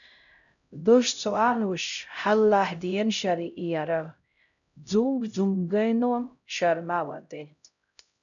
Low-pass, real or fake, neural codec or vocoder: 7.2 kHz; fake; codec, 16 kHz, 0.5 kbps, X-Codec, HuBERT features, trained on LibriSpeech